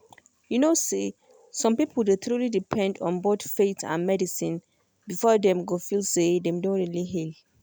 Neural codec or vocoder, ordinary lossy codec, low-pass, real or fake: none; none; none; real